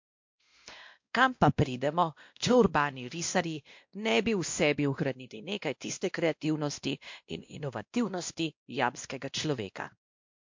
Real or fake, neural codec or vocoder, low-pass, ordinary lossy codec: fake; codec, 16 kHz, 1 kbps, X-Codec, WavLM features, trained on Multilingual LibriSpeech; 7.2 kHz; MP3, 48 kbps